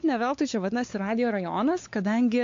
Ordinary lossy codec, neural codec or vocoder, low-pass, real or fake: AAC, 48 kbps; codec, 16 kHz, 4 kbps, X-Codec, WavLM features, trained on Multilingual LibriSpeech; 7.2 kHz; fake